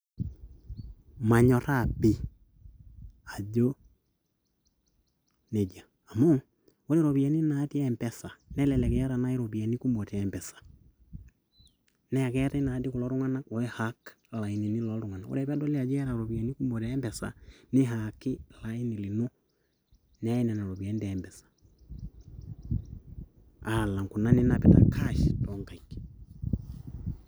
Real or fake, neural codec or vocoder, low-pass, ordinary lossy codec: real; none; none; none